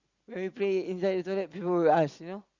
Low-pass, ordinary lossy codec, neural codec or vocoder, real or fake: 7.2 kHz; Opus, 64 kbps; none; real